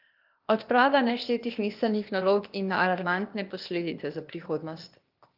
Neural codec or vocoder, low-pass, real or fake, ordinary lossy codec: codec, 16 kHz, 0.8 kbps, ZipCodec; 5.4 kHz; fake; Opus, 32 kbps